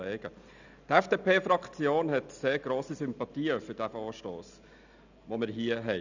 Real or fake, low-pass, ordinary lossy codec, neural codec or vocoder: real; 7.2 kHz; none; none